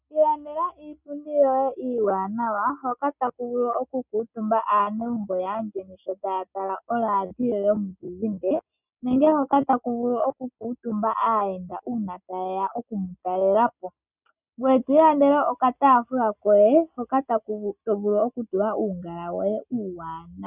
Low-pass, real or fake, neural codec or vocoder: 3.6 kHz; real; none